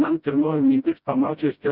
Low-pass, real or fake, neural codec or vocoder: 5.4 kHz; fake; codec, 16 kHz, 0.5 kbps, FreqCodec, smaller model